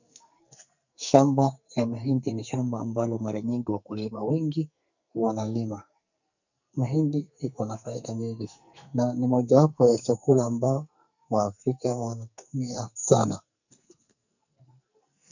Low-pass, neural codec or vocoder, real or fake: 7.2 kHz; codec, 44.1 kHz, 2.6 kbps, SNAC; fake